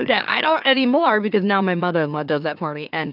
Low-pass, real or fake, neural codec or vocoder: 5.4 kHz; fake; autoencoder, 44.1 kHz, a latent of 192 numbers a frame, MeloTTS